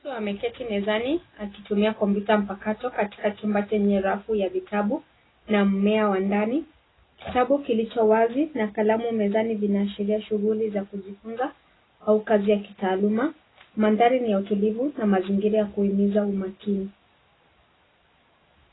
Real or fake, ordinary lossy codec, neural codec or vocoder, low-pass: real; AAC, 16 kbps; none; 7.2 kHz